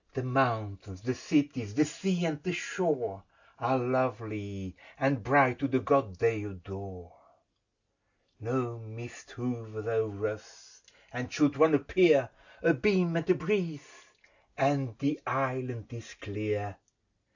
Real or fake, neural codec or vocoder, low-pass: real; none; 7.2 kHz